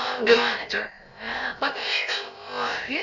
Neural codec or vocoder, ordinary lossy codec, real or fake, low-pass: codec, 16 kHz, about 1 kbps, DyCAST, with the encoder's durations; none; fake; 7.2 kHz